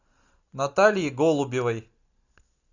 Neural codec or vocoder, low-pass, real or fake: none; 7.2 kHz; real